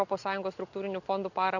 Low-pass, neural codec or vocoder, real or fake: 7.2 kHz; none; real